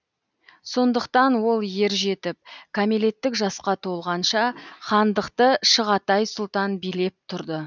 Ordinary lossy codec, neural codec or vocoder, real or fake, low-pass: none; none; real; 7.2 kHz